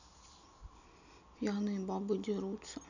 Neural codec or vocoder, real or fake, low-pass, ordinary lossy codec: none; real; 7.2 kHz; none